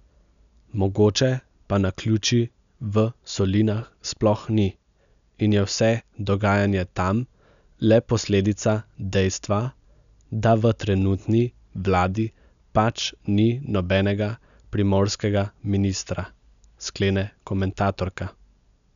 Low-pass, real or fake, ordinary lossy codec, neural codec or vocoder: 7.2 kHz; real; none; none